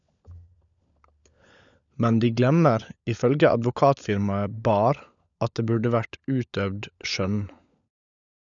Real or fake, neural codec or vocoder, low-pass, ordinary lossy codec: fake; codec, 16 kHz, 16 kbps, FunCodec, trained on LibriTTS, 50 frames a second; 7.2 kHz; none